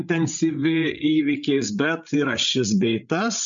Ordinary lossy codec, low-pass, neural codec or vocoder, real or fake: MP3, 64 kbps; 7.2 kHz; codec, 16 kHz, 8 kbps, FreqCodec, larger model; fake